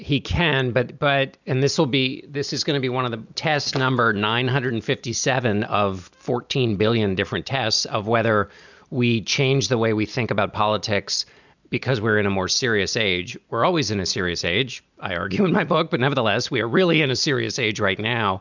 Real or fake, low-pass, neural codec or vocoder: real; 7.2 kHz; none